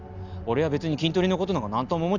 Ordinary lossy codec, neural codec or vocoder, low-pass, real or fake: none; none; 7.2 kHz; real